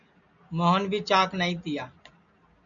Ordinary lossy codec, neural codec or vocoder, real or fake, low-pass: MP3, 96 kbps; none; real; 7.2 kHz